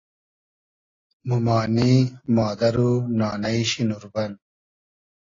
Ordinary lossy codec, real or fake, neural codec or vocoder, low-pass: AAC, 32 kbps; real; none; 7.2 kHz